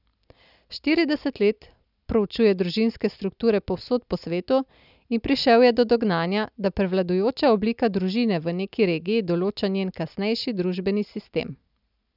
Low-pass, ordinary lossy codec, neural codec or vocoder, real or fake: 5.4 kHz; none; none; real